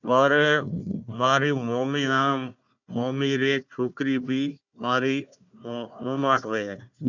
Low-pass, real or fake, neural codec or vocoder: 7.2 kHz; fake; codec, 16 kHz, 1 kbps, FunCodec, trained on Chinese and English, 50 frames a second